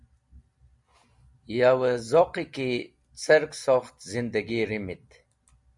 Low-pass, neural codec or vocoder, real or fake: 10.8 kHz; none; real